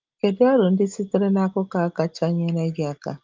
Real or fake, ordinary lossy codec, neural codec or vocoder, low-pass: real; Opus, 32 kbps; none; 7.2 kHz